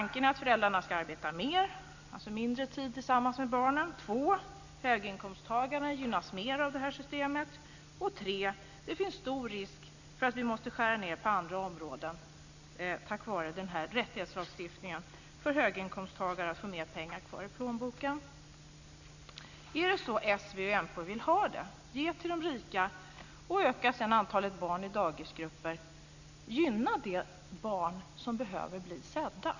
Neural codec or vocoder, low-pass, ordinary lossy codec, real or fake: none; 7.2 kHz; none; real